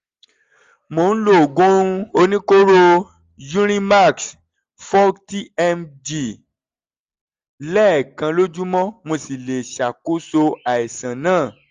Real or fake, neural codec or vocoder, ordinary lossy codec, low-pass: real; none; Opus, 32 kbps; 7.2 kHz